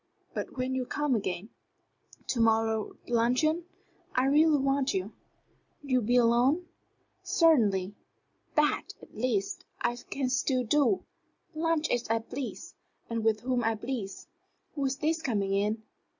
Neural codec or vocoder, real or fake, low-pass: none; real; 7.2 kHz